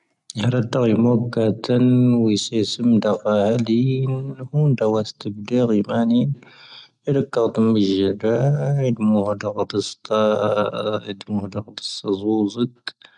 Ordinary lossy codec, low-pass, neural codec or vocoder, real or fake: none; 10.8 kHz; none; real